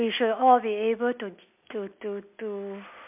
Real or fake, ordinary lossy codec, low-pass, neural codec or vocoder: real; MP3, 32 kbps; 3.6 kHz; none